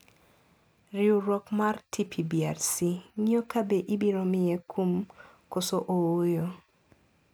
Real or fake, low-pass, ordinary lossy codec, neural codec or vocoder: real; none; none; none